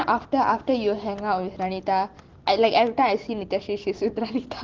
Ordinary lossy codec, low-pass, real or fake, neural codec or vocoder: Opus, 16 kbps; 7.2 kHz; real; none